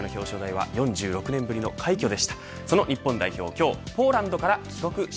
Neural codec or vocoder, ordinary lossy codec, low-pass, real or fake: none; none; none; real